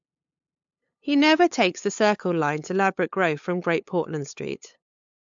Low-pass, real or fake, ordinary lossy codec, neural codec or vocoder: 7.2 kHz; fake; MP3, 64 kbps; codec, 16 kHz, 8 kbps, FunCodec, trained on LibriTTS, 25 frames a second